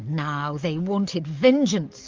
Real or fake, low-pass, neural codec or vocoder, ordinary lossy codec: fake; 7.2 kHz; codec, 44.1 kHz, 7.8 kbps, DAC; Opus, 32 kbps